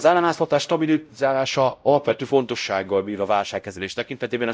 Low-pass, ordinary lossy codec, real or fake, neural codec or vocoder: none; none; fake; codec, 16 kHz, 0.5 kbps, X-Codec, WavLM features, trained on Multilingual LibriSpeech